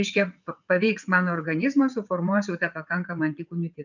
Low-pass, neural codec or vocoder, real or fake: 7.2 kHz; vocoder, 22.05 kHz, 80 mel bands, WaveNeXt; fake